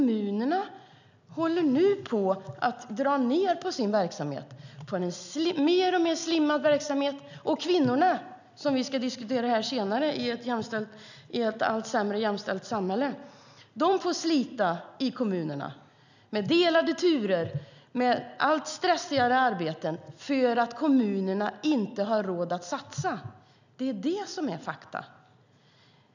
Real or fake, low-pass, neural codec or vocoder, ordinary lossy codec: real; 7.2 kHz; none; none